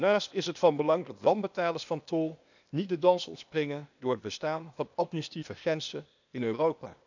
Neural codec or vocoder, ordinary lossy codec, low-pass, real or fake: codec, 16 kHz, 0.8 kbps, ZipCodec; none; 7.2 kHz; fake